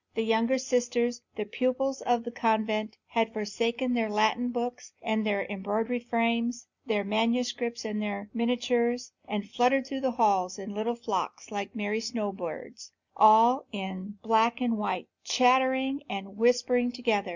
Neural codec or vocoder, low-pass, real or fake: none; 7.2 kHz; real